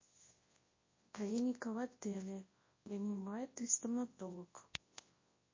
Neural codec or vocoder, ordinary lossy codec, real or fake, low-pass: codec, 24 kHz, 0.9 kbps, WavTokenizer, large speech release; MP3, 32 kbps; fake; 7.2 kHz